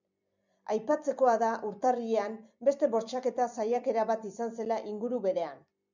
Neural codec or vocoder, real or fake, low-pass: vocoder, 44.1 kHz, 128 mel bands every 256 samples, BigVGAN v2; fake; 7.2 kHz